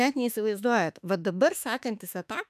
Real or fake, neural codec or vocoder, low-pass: fake; autoencoder, 48 kHz, 32 numbers a frame, DAC-VAE, trained on Japanese speech; 14.4 kHz